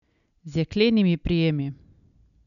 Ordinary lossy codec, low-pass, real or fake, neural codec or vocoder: none; 7.2 kHz; real; none